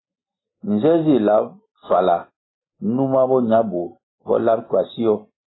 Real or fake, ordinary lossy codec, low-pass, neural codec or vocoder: real; AAC, 16 kbps; 7.2 kHz; none